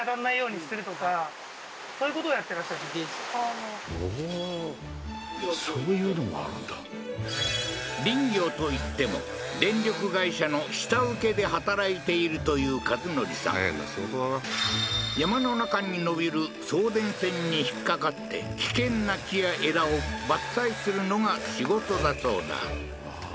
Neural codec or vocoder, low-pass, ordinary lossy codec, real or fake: none; none; none; real